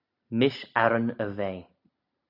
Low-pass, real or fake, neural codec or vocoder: 5.4 kHz; real; none